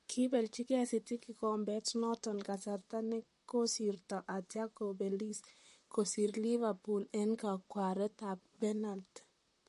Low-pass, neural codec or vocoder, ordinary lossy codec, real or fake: 14.4 kHz; vocoder, 44.1 kHz, 128 mel bands, Pupu-Vocoder; MP3, 48 kbps; fake